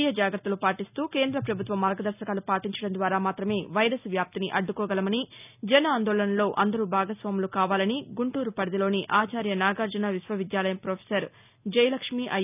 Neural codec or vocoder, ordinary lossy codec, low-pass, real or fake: none; none; 3.6 kHz; real